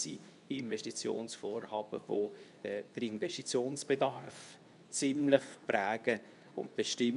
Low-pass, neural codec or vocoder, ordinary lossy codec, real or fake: 10.8 kHz; codec, 24 kHz, 0.9 kbps, WavTokenizer, medium speech release version 2; none; fake